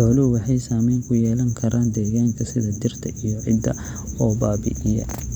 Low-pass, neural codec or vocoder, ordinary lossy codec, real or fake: 19.8 kHz; vocoder, 44.1 kHz, 128 mel bands every 512 samples, BigVGAN v2; Opus, 64 kbps; fake